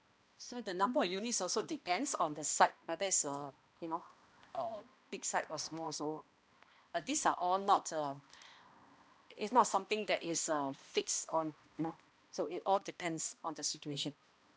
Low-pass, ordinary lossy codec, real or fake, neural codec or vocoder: none; none; fake; codec, 16 kHz, 1 kbps, X-Codec, HuBERT features, trained on balanced general audio